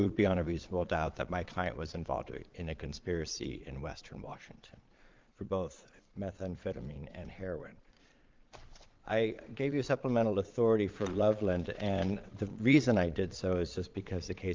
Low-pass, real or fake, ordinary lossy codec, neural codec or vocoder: 7.2 kHz; fake; Opus, 32 kbps; vocoder, 22.05 kHz, 80 mel bands, Vocos